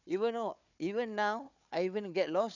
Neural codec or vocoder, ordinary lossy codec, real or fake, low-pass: codec, 16 kHz, 4 kbps, FunCodec, trained on Chinese and English, 50 frames a second; none; fake; 7.2 kHz